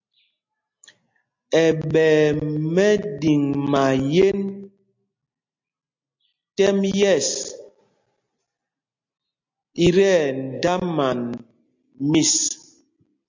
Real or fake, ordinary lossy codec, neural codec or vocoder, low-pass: real; MP3, 48 kbps; none; 7.2 kHz